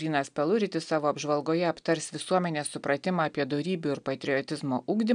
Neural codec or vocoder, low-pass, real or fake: none; 9.9 kHz; real